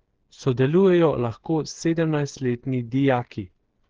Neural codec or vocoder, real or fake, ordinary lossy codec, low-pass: codec, 16 kHz, 8 kbps, FreqCodec, smaller model; fake; Opus, 16 kbps; 7.2 kHz